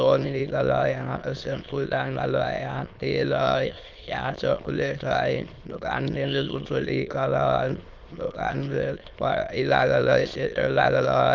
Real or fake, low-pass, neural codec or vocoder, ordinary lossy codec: fake; 7.2 kHz; autoencoder, 22.05 kHz, a latent of 192 numbers a frame, VITS, trained on many speakers; Opus, 32 kbps